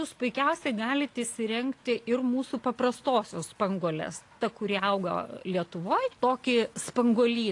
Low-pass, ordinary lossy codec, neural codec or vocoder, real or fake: 10.8 kHz; AAC, 48 kbps; none; real